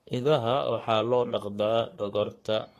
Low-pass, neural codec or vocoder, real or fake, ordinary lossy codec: 14.4 kHz; codec, 44.1 kHz, 3.4 kbps, Pupu-Codec; fake; AAC, 48 kbps